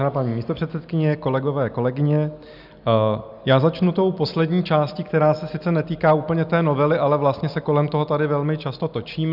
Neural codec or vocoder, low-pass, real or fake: none; 5.4 kHz; real